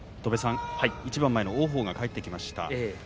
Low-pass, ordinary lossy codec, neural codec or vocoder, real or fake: none; none; none; real